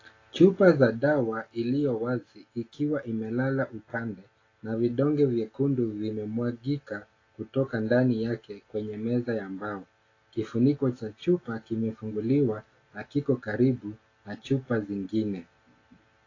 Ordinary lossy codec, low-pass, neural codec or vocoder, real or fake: AAC, 32 kbps; 7.2 kHz; none; real